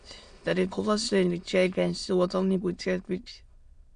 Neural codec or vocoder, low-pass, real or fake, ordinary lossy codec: autoencoder, 22.05 kHz, a latent of 192 numbers a frame, VITS, trained on many speakers; 9.9 kHz; fake; Opus, 64 kbps